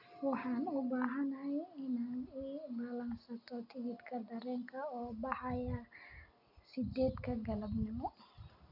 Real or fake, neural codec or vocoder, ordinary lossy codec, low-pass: real; none; none; 5.4 kHz